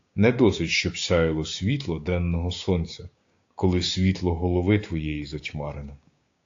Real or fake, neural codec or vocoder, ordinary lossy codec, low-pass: fake; codec, 16 kHz, 6 kbps, DAC; AAC, 48 kbps; 7.2 kHz